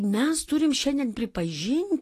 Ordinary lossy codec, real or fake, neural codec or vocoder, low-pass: AAC, 48 kbps; real; none; 14.4 kHz